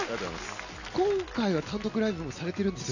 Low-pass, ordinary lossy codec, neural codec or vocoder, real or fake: 7.2 kHz; none; none; real